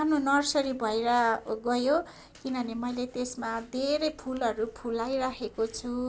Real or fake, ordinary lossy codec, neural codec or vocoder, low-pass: real; none; none; none